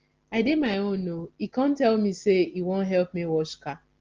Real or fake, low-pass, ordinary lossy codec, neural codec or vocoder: real; 7.2 kHz; Opus, 16 kbps; none